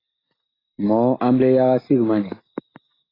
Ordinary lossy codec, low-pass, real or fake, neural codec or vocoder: AAC, 24 kbps; 5.4 kHz; real; none